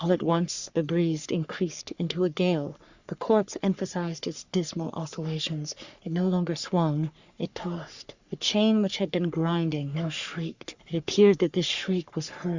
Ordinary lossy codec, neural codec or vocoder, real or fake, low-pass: Opus, 64 kbps; codec, 44.1 kHz, 3.4 kbps, Pupu-Codec; fake; 7.2 kHz